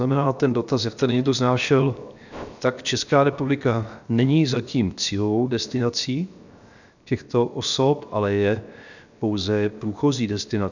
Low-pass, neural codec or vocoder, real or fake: 7.2 kHz; codec, 16 kHz, 0.7 kbps, FocalCodec; fake